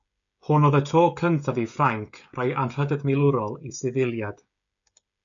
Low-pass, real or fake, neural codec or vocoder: 7.2 kHz; fake; codec, 16 kHz, 16 kbps, FreqCodec, smaller model